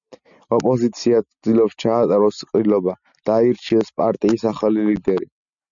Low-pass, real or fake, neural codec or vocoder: 7.2 kHz; real; none